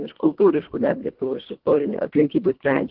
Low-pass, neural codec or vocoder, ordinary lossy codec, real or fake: 5.4 kHz; codec, 24 kHz, 1.5 kbps, HILCodec; Opus, 16 kbps; fake